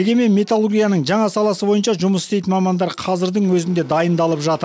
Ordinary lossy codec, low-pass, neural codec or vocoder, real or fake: none; none; none; real